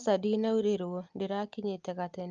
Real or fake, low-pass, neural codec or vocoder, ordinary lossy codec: real; 7.2 kHz; none; Opus, 24 kbps